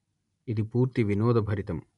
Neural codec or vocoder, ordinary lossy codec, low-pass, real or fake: none; none; 10.8 kHz; real